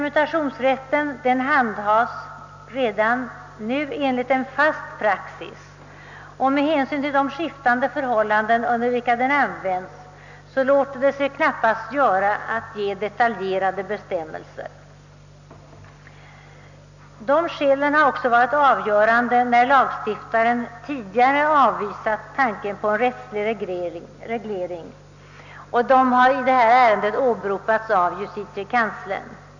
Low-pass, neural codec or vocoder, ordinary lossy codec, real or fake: 7.2 kHz; none; none; real